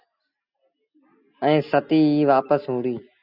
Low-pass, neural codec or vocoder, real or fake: 5.4 kHz; none; real